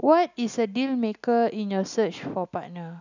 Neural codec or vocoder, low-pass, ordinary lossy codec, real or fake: none; 7.2 kHz; none; real